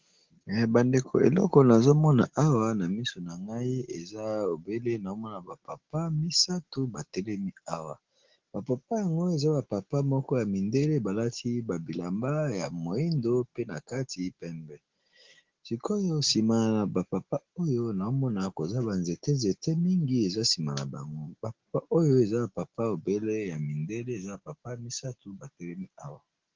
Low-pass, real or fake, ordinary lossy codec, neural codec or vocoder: 7.2 kHz; real; Opus, 16 kbps; none